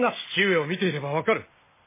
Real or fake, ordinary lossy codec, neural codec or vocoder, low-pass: real; MP3, 16 kbps; none; 3.6 kHz